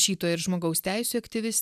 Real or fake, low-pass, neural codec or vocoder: real; 14.4 kHz; none